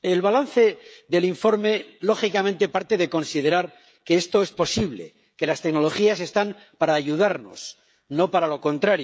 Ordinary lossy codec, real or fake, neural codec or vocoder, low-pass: none; fake; codec, 16 kHz, 16 kbps, FreqCodec, smaller model; none